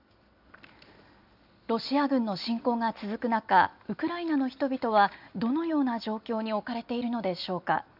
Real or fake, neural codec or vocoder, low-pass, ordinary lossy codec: real; none; 5.4 kHz; none